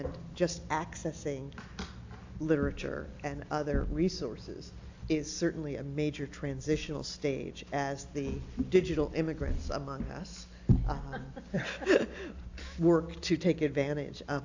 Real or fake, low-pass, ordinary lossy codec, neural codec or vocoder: real; 7.2 kHz; AAC, 48 kbps; none